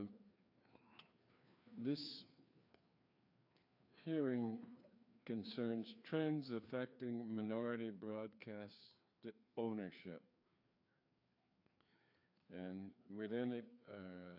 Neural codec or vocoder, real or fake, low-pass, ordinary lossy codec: codec, 16 kHz, 2 kbps, FreqCodec, larger model; fake; 5.4 kHz; AAC, 48 kbps